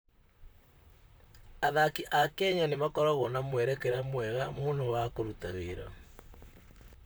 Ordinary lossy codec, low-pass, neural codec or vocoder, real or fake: none; none; vocoder, 44.1 kHz, 128 mel bands, Pupu-Vocoder; fake